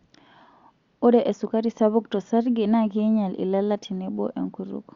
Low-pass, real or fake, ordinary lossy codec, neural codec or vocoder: 7.2 kHz; real; Opus, 64 kbps; none